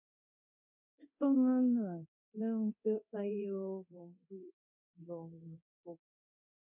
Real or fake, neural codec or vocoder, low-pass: fake; codec, 24 kHz, 0.9 kbps, DualCodec; 3.6 kHz